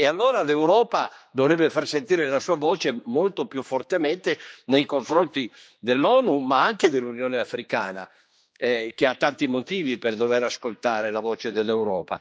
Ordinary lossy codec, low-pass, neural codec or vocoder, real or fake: none; none; codec, 16 kHz, 2 kbps, X-Codec, HuBERT features, trained on general audio; fake